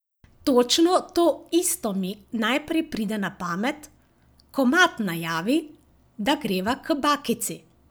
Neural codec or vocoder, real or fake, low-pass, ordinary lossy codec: none; real; none; none